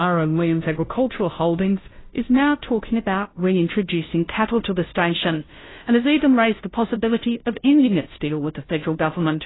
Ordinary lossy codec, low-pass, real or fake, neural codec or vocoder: AAC, 16 kbps; 7.2 kHz; fake; codec, 16 kHz, 0.5 kbps, FunCodec, trained on Chinese and English, 25 frames a second